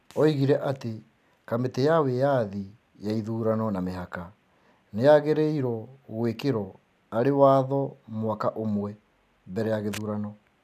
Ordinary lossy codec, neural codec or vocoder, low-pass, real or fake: none; none; 14.4 kHz; real